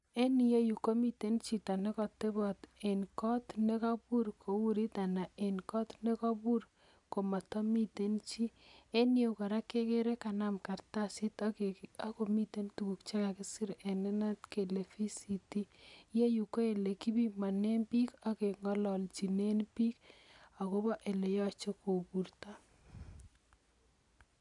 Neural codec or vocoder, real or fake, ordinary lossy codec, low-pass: none; real; none; 10.8 kHz